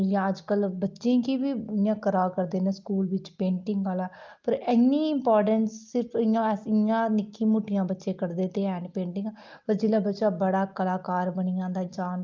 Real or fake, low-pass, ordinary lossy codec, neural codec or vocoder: real; 7.2 kHz; Opus, 24 kbps; none